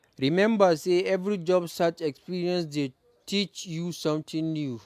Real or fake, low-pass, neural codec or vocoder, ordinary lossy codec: real; 14.4 kHz; none; AAC, 96 kbps